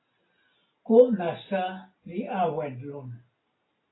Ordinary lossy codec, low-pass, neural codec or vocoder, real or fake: AAC, 16 kbps; 7.2 kHz; none; real